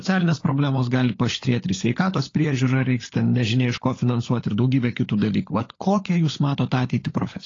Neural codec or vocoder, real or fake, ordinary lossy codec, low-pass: codec, 16 kHz, 16 kbps, FunCodec, trained on LibriTTS, 50 frames a second; fake; AAC, 32 kbps; 7.2 kHz